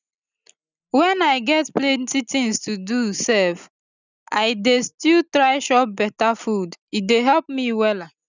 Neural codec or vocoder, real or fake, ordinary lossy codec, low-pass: none; real; none; 7.2 kHz